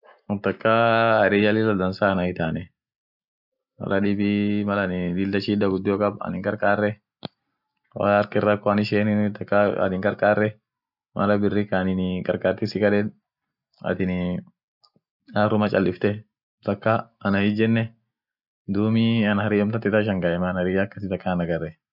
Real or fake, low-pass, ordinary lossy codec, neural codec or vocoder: real; 5.4 kHz; none; none